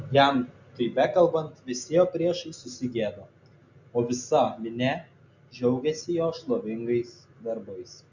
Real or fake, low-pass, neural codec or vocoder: real; 7.2 kHz; none